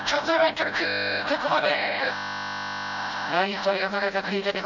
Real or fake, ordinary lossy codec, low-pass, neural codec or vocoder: fake; none; 7.2 kHz; codec, 16 kHz, 0.5 kbps, FreqCodec, smaller model